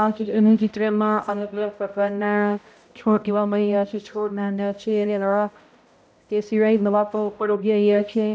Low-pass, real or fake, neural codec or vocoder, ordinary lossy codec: none; fake; codec, 16 kHz, 0.5 kbps, X-Codec, HuBERT features, trained on balanced general audio; none